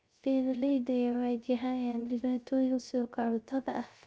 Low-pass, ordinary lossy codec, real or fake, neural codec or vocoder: none; none; fake; codec, 16 kHz, 0.3 kbps, FocalCodec